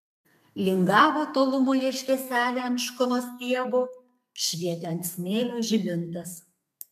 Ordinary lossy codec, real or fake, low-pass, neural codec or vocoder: MP3, 96 kbps; fake; 14.4 kHz; codec, 32 kHz, 1.9 kbps, SNAC